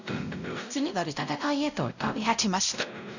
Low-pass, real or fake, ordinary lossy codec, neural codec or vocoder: 7.2 kHz; fake; none; codec, 16 kHz, 0.5 kbps, X-Codec, WavLM features, trained on Multilingual LibriSpeech